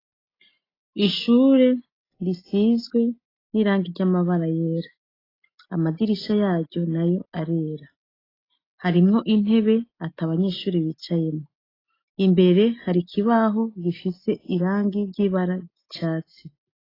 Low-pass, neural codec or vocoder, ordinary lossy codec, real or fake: 5.4 kHz; none; AAC, 24 kbps; real